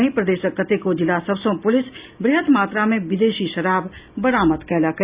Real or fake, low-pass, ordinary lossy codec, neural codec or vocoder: real; 3.6 kHz; Opus, 64 kbps; none